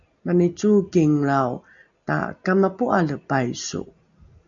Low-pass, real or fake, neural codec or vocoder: 7.2 kHz; real; none